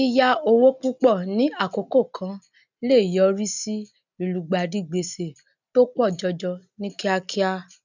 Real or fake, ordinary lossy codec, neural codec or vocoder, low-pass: real; none; none; 7.2 kHz